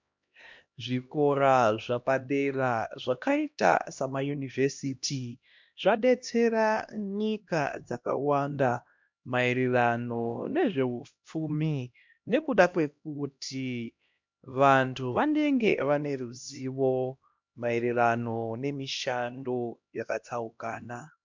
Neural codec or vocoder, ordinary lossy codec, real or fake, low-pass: codec, 16 kHz, 1 kbps, X-Codec, HuBERT features, trained on LibriSpeech; MP3, 64 kbps; fake; 7.2 kHz